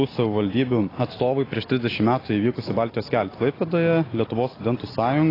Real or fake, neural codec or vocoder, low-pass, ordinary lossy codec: real; none; 5.4 kHz; AAC, 24 kbps